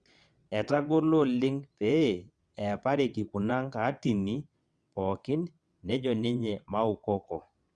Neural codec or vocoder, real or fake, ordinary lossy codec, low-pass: vocoder, 22.05 kHz, 80 mel bands, WaveNeXt; fake; Opus, 64 kbps; 9.9 kHz